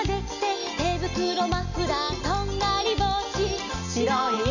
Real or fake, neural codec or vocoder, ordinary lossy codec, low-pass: real; none; none; 7.2 kHz